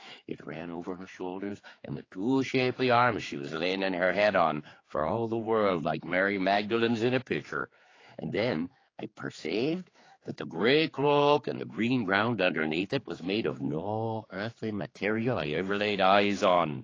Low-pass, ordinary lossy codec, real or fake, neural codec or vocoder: 7.2 kHz; AAC, 32 kbps; fake; codec, 16 kHz, 4 kbps, X-Codec, HuBERT features, trained on general audio